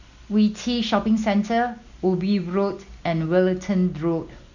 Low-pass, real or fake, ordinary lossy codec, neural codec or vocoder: 7.2 kHz; real; none; none